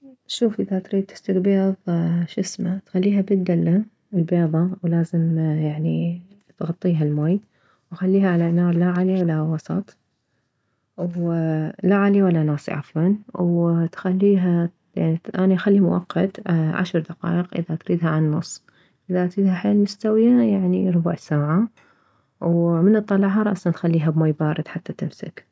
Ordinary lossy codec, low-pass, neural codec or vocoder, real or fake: none; none; none; real